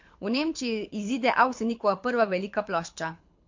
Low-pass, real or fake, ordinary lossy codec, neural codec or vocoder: 7.2 kHz; fake; MP3, 48 kbps; codec, 24 kHz, 6 kbps, HILCodec